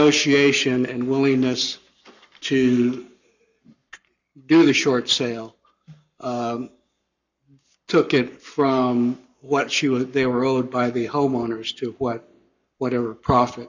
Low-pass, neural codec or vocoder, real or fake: 7.2 kHz; codec, 44.1 kHz, 7.8 kbps, Pupu-Codec; fake